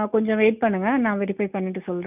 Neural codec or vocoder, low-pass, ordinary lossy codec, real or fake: none; 3.6 kHz; none; real